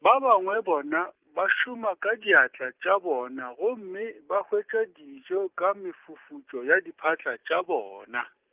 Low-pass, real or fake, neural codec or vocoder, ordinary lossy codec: 3.6 kHz; real; none; Opus, 24 kbps